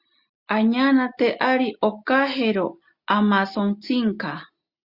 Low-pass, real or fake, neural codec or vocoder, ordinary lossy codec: 5.4 kHz; real; none; Opus, 64 kbps